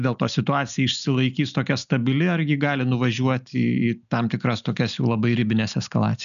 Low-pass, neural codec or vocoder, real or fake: 7.2 kHz; none; real